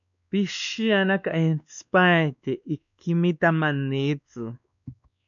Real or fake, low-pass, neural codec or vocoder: fake; 7.2 kHz; codec, 16 kHz, 2 kbps, X-Codec, WavLM features, trained on Multilingual LibriSpeech